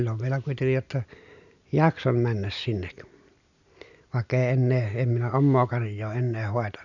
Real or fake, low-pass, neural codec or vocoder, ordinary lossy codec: real; 7.2 kHz; none; none